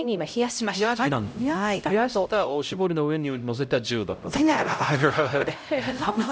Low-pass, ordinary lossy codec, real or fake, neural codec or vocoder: none; none; fake; codec, 16 kHz, 0.5 kbps, X-Codec, HuBERT features, trained on LibriSpeech